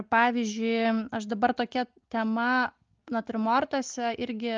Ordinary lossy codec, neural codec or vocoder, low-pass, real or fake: Opus, 32 kbps; none; 7.2 kHz; real